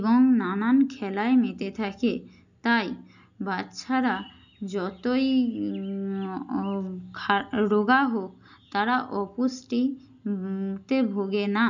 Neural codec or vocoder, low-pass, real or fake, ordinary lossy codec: none; 7.2 kHz; real; none